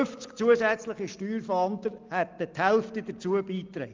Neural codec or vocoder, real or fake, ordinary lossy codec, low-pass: none; real; Opus, 24 kbps; 7.2 kHz